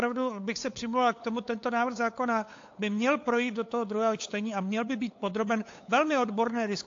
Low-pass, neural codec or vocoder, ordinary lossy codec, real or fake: 7.2 kHz; codec, 16 kHz, 8 kbps, FunCodec, trained on LibriTTS, 25 frames a second; AAC, 48 kbps; fake